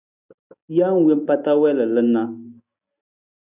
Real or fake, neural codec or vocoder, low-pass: real; none; 3.6 kHz